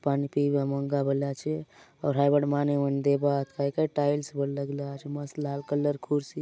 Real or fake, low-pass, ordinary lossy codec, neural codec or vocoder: real; none; none; none